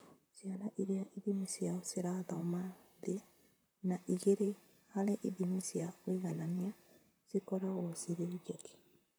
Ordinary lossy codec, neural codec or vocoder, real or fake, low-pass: none; vocoder, 44.1 kHz, 128 mel bands, Pupu-Vocoder; fake; none